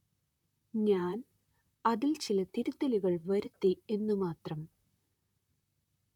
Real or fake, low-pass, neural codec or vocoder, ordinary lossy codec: fake; 19.8 kHz; vocoder, 44.1 kHz, 128 mel bands, Pupu-Vocoder; none